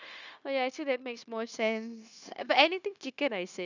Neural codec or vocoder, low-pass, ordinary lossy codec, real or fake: codec, 16 kHz, 0.9 kbps, LongCat-Audio-Codec; 7.2 kHz; none; fake